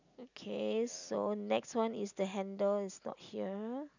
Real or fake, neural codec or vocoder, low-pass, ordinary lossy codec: real; none; 7.2 kHz; none